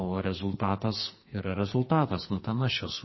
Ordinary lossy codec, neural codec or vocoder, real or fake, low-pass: MP3, 24 kbps; codec, 44.1 kHz, 2.6 kbps, SNAC; fake; 7.2 kHz